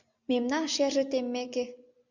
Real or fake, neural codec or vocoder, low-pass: real; none; 7.2 kHz